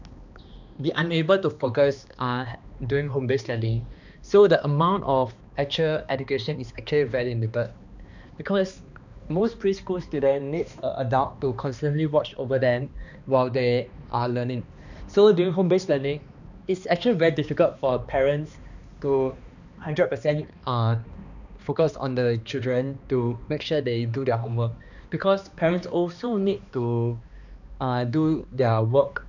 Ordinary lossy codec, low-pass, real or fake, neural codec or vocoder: none; 7.2 kHz; fake; codec, 16 kHz, 2 kbps, X-Codec, HuBERT features, trained on balanced general audio